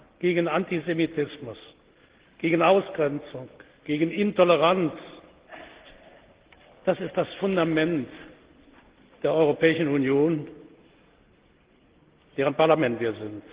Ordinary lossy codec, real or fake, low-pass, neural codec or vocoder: Opus, 16 kbps; real; 3.6 kHz; none